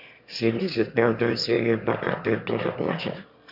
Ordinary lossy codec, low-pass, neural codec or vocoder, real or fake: none; 5.4 kHz; autoencoder, 22.05 kHz, a latent of 192 numbers a frame, VITS, trained on one speaker; fake